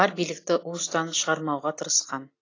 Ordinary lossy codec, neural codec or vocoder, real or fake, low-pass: AAC, 32 kbps; none; real; 7.2 kHz